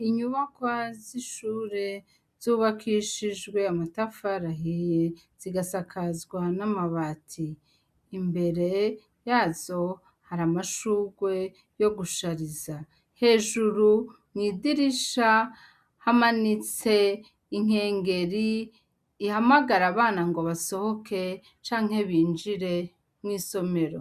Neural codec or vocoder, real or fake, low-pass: none; real; 14.4 kHz